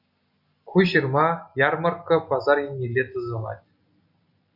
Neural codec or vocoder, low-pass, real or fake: none; 5.4 kHz; real